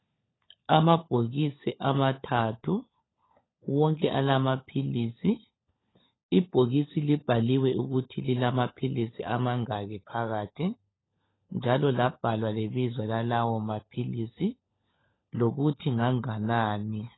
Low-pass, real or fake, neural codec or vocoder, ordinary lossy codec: 7.2 kHz; fake; codec, 16 kHz, 16 kbps, FunCodec, trained on LibriTTS, 50 frames a second; AAC, 16 kbps